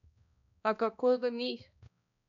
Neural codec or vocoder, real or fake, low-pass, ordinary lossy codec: codec, 16 kHz, 1 kbps, X-Codec, HuBERT features, trained on balanced general audio; fake; 7.2 kHz; none